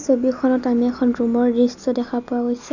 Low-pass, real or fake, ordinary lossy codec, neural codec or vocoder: 7.2 kHz; real; none; none